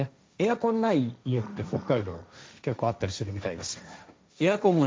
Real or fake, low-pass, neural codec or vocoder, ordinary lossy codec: fake; none; codec, 16 kHz, 1.1 kbps, Voila-Tokenizer; none